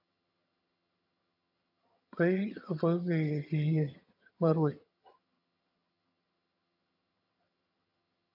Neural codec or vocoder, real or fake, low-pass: vocoder, 22.05 kHz, 80 mel bands, HiFi-GAN; fake; 5.4 kHz